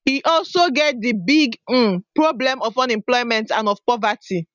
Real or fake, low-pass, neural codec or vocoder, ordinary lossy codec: real; 7.2 kHz; none; none